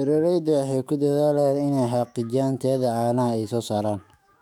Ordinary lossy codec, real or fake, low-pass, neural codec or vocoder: none; fake; 19.8 kHz; autoencoder, 48 kHz, 128 numbers a frame, DAC-VAE, trained on Japanese speech